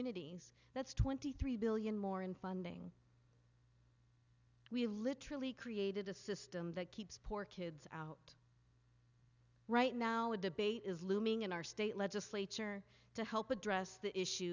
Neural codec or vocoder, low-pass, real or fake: none; 7.2 kHz; real